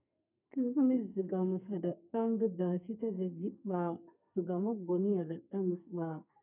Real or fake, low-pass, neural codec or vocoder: fake; 3.6 kHz; codec, 32 kHz, 1.9 kbps, SNAC